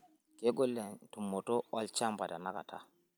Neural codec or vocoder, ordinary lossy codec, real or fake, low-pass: none; none; real; none